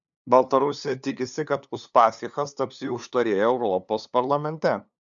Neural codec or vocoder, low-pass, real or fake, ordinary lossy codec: codec, 16 kHz, 2 kbps, FunCodec, trained on LibriTTS, 25 frames a second; 7.2 kHz; fake; MP3, 96 kbps